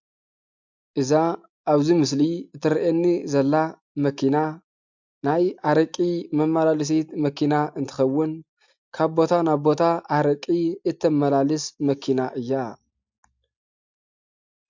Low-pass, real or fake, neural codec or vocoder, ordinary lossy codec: 7.2 kHz; real; none; MP3, 64 kbps